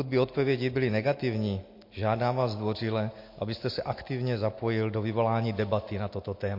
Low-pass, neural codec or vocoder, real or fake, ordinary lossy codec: 5.4 kHz; none; real; MP3, 32 kbps